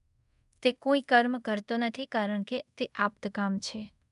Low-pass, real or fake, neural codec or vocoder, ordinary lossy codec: 10.8 kHz; fake; codec, 24 kHz, 0.5 kbps, DualCodec; MP3, 96 kbps